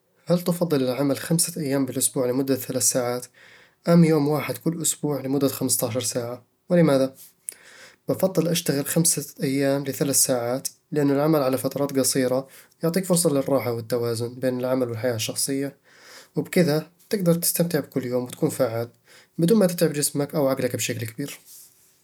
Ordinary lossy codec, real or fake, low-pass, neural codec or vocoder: none; real; none; none